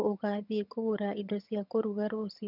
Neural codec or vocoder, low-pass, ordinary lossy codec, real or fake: vocoder, 22.05 kHz, 80 mel bands, HiFi-GAN; 5.4 kHz; none; fake